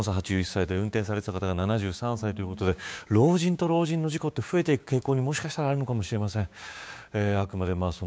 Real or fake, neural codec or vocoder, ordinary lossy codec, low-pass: fake; codec, 16 kHz, 6 kbps, DAC; none; none